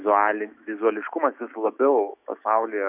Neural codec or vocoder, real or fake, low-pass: autoencoder, 48 kHz, 128 numbers a frame, DAC-VAE, trained on Japanese speech; fake; 3.6 kHz